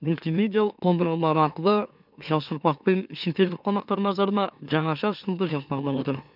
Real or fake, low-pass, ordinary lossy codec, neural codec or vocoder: fake; 5.4 kHz; none; autoencoder, 44.1 kHz, a latent of 192 numbers a frame, MeloTTS